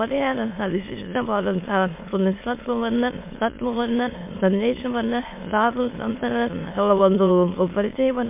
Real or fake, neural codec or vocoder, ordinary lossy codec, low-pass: fake; autoencoder, 22.05 kHz, a latent of 192 numbers a frame, VITS, trained on many speakers; MP3, 24 kbps; 3.6 kHz